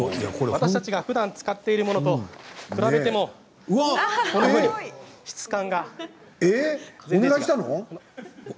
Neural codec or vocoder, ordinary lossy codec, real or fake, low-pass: none; none; real; none